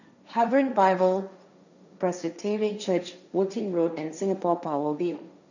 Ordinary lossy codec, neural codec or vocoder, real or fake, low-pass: none; codec, 16 kHz, 1.1 kbps, Voila-Tokenizer; fake; 7.2 kHz